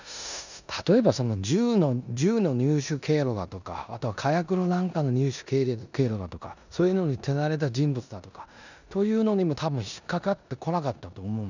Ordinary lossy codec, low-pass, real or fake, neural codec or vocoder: none; 7.2 kHz; fake; codec, 16 kHz in and 24 kHz out, 0.9 kbps, LongCat-Audio-Codec, four codebook decoder